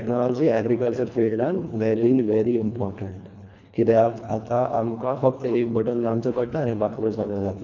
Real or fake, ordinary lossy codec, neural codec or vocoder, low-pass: fake; none; codec, 24 kHz, 1.5 kbps, HILCodec; 7.2 kHz